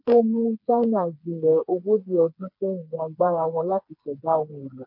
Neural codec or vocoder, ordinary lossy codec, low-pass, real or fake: codec, 16 kHz, 4 kbps, FreqCodec, smaller model; MP3, 32 kbps; 5.4 kHz; fake